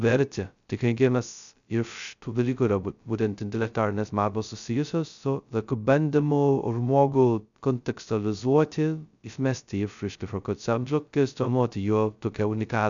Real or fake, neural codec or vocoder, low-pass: fake; codec, 16 kHz, 0.2 kbps, FocalCodec; 7.2 kHz